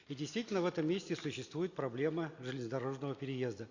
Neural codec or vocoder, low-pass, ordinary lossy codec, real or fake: none; 7.2 kHz; Opus, 64 kbps; real